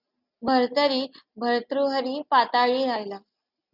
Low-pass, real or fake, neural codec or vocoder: 5.4 kHz; real; none